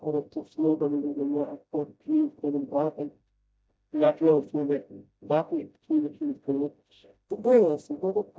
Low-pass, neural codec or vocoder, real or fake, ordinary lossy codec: none; codec, 16 kHz, 0.5 kbps, FreqCodec, smaller model; fake; none